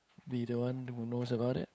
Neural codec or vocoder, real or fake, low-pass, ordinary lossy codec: codec, 16 kHz, 8 kbps, FunCodec, trained on LibriTTS, 25 frames a second; fake; none; none